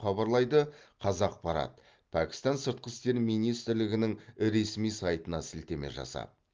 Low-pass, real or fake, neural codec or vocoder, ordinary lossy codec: 7.2 kHz; real; none; Opus, 24 kbps